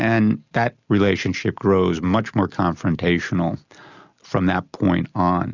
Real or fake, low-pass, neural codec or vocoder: real; 7.2 kHz; none